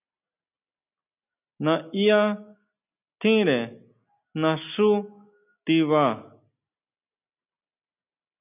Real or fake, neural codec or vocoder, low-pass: real; none; 3.6 kHz